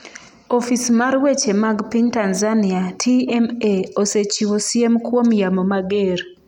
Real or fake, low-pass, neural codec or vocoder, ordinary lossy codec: real; 19.8 kHz; none; none